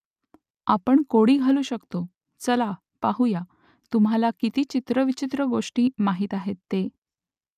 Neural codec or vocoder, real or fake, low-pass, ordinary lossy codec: none; real; 14.4 kHz; none